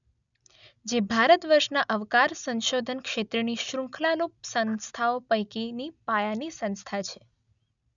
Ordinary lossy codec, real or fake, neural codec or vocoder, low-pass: none; real; none; 7.2 kHz